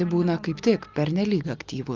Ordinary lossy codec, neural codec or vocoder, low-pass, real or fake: Opus, 24 kbps; none; 7.2 kHz; real